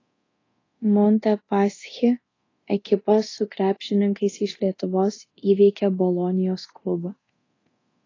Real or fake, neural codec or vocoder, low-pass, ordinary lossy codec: fake; codec, 24 kHz, 0.9 kbps, DualCodec; 7.2 kHz; AAC, 32 kbps